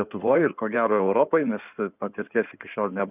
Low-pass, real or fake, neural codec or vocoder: 3.6 kHz; fake; codec, 16 kHz in and 24 kHz out, 2.2 kbps, FireRedTTS-2 codec